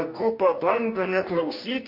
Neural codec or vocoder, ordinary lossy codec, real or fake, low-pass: codec, 44.1 kHz, 2.6 kbps, DAC; MP3, 32 kbps; fake; 5.4 kHz